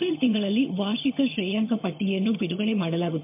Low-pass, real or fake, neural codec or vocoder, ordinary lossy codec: 3.6 kHz; fake; vocoder, 22.05 kHz, 80 mel bands, HiFi-GAN; MP3, 32 kbps